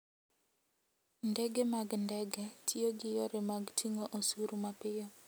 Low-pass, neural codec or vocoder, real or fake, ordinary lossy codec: none; none; real; none